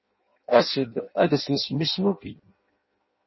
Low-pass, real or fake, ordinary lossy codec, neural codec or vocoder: 7.2 kHz; fake; MP3, 24 kbps; codec, 16 kHz in and 24 kHz out, 0.6 kbps, FireRedTTS-2 codec